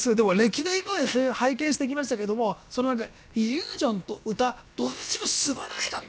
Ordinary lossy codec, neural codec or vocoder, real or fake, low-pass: none; codec, 16 kHz, about 1 kbps, DyCAST, with the encoder's durations; fake; none